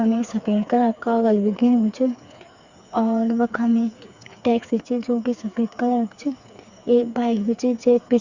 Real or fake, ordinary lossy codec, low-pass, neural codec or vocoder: fake; Opus, 64 kbps; 7.2 kHz; codec, 16 kHz, 4 kbps, FreqCodec, smaller model